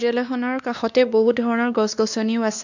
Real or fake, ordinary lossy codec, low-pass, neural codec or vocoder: fake; none; 7.2 kHz; codec, 16 kHz, 2 kbps, X-Codec, WavLM features, trained on Multilingual LibriSpeech